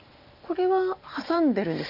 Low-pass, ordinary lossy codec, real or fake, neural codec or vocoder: 5.4 kHz; none; real; none